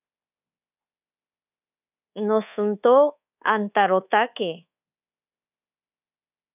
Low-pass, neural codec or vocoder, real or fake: 3.6 kHz; codec, 24 kHz, 1.2 kbps, DualCodec; fake